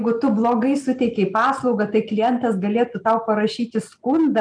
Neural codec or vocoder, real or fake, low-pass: none; real; 9.9 kHz